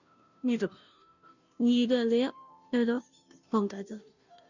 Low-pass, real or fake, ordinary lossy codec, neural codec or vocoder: 7.2 kHz; fake; none; codec, 16 kHz, 0.5 kbps, FunCodec, trained on Chinese and English, 25 frames a second